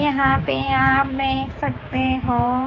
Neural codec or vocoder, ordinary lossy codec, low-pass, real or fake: codec, 16 kHz, 4 kbps, X-Codec, HuBERT features, trained on general audio; AAC, 32 kbps; 7.2 kHz; fake